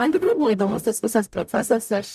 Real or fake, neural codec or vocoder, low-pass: fake; codec, 44.1 kHz, 0.9 kbps, DAC; 14.4 kHz